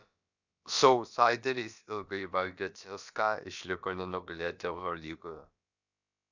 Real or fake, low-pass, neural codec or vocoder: fake; 7.2 kHz; codec, 16 kHz, about 1 kbps, DyCAST, with the encoder's durations